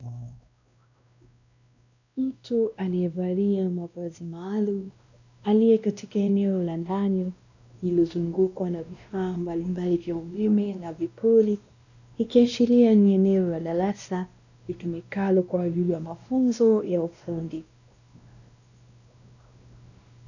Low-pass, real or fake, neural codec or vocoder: 7.2 kHz; fake; codec, 16 kHz, 1 kbps, X-Codec, WavLM features, trained on Multilingual LibriSpeech